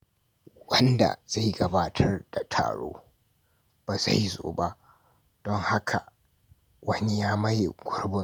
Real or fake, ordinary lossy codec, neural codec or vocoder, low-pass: fake; none; vocoder, 48 kHz, 128 mel bands, Vocos; none